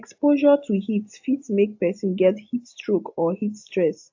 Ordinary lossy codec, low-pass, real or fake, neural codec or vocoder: AAC, 48 kbps; 7.2 kHz; real; none